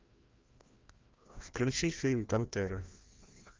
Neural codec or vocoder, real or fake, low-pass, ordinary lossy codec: codec, 16 kHz, 1 kbps, FreqCodec, larger model; fake; 7.2 kHz; Opus, 32 kbps